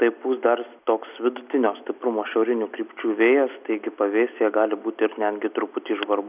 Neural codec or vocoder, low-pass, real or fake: none; 3.6 kHz; real